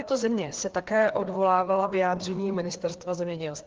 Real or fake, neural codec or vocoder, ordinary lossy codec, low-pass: fake; codec, 16 kHz, 2 kbps, FreqCodec, larger model; Opus, 16 kbps; 7.2 kHz